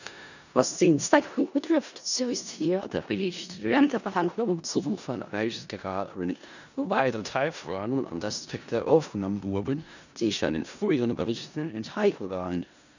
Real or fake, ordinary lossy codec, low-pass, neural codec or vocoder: fake; none; 7.2 kHz; codec, 16 kHz in and 24 kHz out, 0.4 kbps, LongCat-Audio-Codec, four codebook decoder